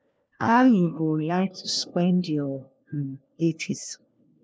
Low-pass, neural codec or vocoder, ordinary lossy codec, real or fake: none; codec, 16 kHz, 1 kbps, FunCodec, trained on LibriTTS, 50 frames a second; none; fake